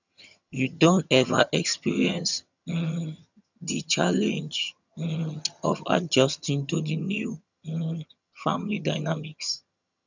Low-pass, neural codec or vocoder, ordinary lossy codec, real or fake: 7.2 kHz; vocoder, 22.05 kHz, 80 mel bands, HiFi-GAN; none; fake